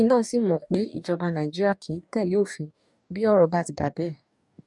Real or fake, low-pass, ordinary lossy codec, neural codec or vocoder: fake; 10.8 kHz; none; codec, 44.1 kHz, 2.6 kbps, DAC